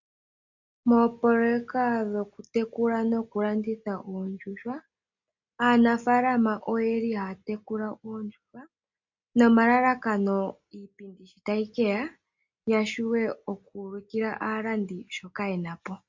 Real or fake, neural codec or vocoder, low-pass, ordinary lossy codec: real; none; 7.2 kHz; MP3, 48 kbps